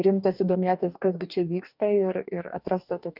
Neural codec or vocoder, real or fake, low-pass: codec, 44.1 kHz, 2.6 kbps, DAC; fake; 5.4 kHz